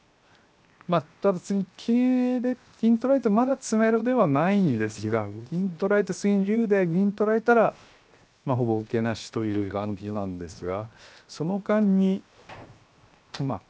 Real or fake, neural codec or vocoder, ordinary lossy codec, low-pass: fake; codec, 16 kHz, 0.7 kbps, FocalCodec; none; none